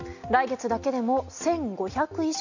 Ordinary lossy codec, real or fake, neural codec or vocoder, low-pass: none; real; none; 7.2 kHz